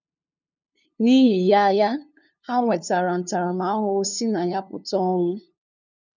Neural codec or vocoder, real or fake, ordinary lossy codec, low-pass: codec, 16 kHz, 2 kbps, FunCodec, trained on LibriTTS, 25 frames a second; fake; none; 7.2 kHz